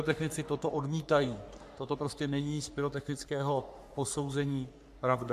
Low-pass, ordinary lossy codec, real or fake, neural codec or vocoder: 14.4 kHz; AAC, 96 kbps; fake; codec, 44.1 kHz, 3.4 kbps, Pupu-Codec